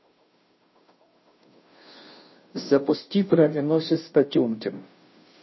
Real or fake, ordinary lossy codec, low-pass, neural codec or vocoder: fake; MP3, 24 kbps; 7.2 kHz; codec, 16 kHz, 0.5 kbps, FunCodec, trained on Chinese and English, 25 frames a second